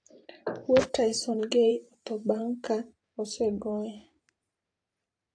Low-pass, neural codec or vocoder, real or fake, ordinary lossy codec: 9.9 kHz; vocoder, 44.1 kHz, 128 mel bands every 512 samples, BigVGAN v2; fake; AAC, 48 kbps